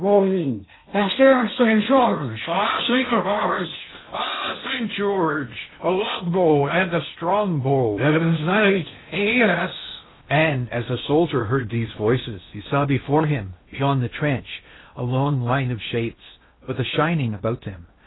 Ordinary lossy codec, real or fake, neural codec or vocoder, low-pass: AAC, 16 kbps; fake; codec, 16 kHz in and 24 kHz out, 0.6 kbps, FocalCodec, streaming, 2048 codes; 7.2 kHz